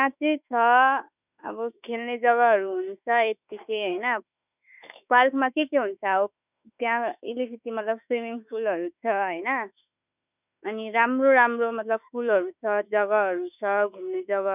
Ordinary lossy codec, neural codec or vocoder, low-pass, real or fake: none; autoencoder, 48 kHz, 32 numbers a frame, DAC-VAE, trained on Japanese speech; 3.6 kHz; fake